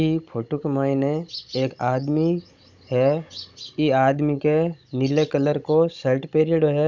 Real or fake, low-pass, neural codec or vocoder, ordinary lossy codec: fake; 7.2 kHz; codec, 16 kHz, 16 kbps, FunCodec, trained on Chinese and English, 50 frames a second; none